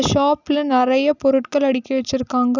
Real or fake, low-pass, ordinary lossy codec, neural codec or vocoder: real; 7.2 kHz; none; none